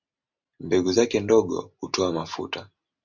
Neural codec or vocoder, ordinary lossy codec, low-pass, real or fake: none; MP3, 64 kbps; 7.2 kHz; real